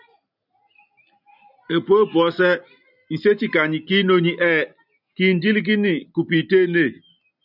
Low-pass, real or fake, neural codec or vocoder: 5.4 kHz; real; none